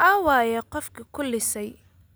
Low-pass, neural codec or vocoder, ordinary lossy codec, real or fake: none; none; none; real